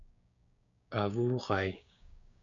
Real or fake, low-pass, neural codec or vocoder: fake; 7.2 kHz; codec, 16 kHz, 6 kbps, DAC